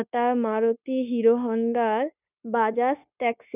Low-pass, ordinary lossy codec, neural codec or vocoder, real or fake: 3.6 kHz; none; codec, 16 kHz, 0.9 kbps, LongCat-Audio-Codec; fake